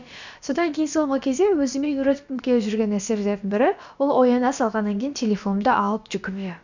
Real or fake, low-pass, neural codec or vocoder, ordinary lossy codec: fake; 7.2 kHz; codec, 16 kHz, about 1 kbps, DyCAST, with the encoder's durations; none